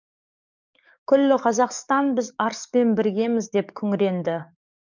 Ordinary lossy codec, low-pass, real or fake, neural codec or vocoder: none; 7.2 kHz; fake; codec, 44.1 kHz, 7.8 kbps, DAC